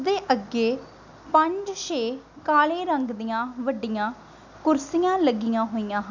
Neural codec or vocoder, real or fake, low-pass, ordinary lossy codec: none; real; 7.2 kHz; none